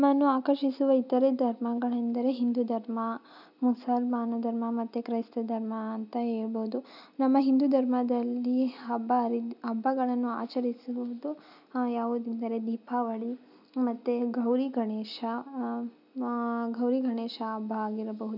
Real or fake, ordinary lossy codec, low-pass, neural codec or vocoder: real; none; 5.4 kHz; none